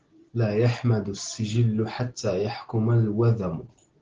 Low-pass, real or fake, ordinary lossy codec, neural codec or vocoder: 7.2 kHz; real; Opus, 16 kbps; none